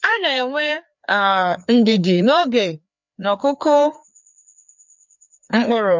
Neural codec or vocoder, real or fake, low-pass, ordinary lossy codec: codec, 16 kHz, 2 kbps, FreqCodec, larger model; fake; 7.2 kHz; MP3, 64 kbps